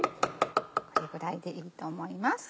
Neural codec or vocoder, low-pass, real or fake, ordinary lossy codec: none; none; real; none